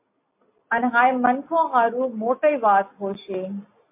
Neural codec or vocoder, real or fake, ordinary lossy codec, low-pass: none; real; MP3, 32 kbps; 3.6 kHz